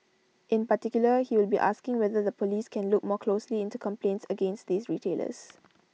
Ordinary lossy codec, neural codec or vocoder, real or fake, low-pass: none; none; real; none